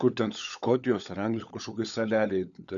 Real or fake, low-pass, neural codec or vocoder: fake; 7.2 kHz; codec, 16 kHz, 16 kbps, FunCodec, trained on LibriTTS, 50 frames a second